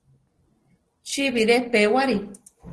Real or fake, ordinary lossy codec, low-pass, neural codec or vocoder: real; Opus, 16 kbps; 10.8 kHz; none